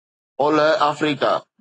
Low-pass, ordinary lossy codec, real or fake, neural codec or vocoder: 9.9 kHz; AAC, 32 kbps; real; none